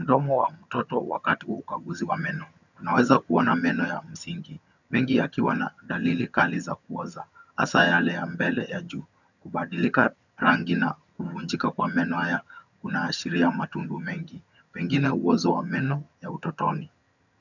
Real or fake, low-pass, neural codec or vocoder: fake; 7.2 kHz; vocoder, 22.05 kHz, 80 mel bands, HiFi-GAN